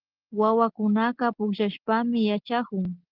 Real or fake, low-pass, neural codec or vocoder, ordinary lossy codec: real; 5.4 kHz; none; Opus, 16 kbps